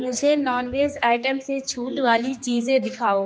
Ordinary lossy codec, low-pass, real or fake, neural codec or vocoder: none; none; fake; codec, 16 kHz, 2 kbps, X-Codec, HuBERT features, trained on general audio